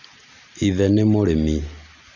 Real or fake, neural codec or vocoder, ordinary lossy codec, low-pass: real; none; none; 7.2 kHz